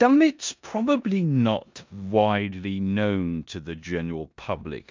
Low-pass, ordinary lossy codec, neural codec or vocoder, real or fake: 7.2 kHz; MP3, 64 kbps; codec, 16 kHz in and 24 kHz out, 0.9 kbps, LongCat-Audio-Codec, four codebook decoder; fake